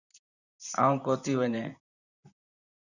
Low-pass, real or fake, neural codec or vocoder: 7.2 kHz; fake; vocoder, 44.1 kHz, 128 mel bands, Pupu-Vocoder